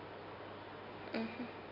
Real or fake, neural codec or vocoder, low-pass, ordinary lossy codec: real; none; 5.4 kHz; none